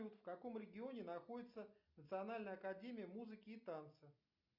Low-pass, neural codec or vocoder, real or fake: 5.4 kHz; none; real